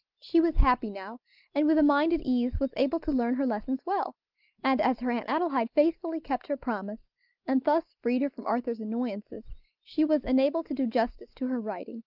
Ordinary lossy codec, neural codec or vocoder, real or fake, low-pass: Opus, 24 kbps; none; real; 5.4 kHz